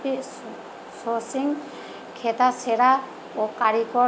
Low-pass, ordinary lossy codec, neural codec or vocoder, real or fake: none; none; none; real